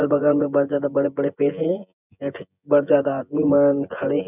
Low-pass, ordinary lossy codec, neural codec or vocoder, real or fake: 3.6 kHz; none; vocoder, 24 kHz, 100 mel bands, Vocos; fake